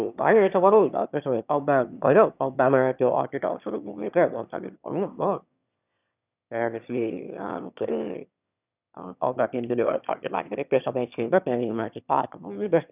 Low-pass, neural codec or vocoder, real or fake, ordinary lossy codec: 3.6 kHz; autoencoder, 22.05 kHz, a latent of 192 numbers a frame, VITS, trained on one speaker; fake; none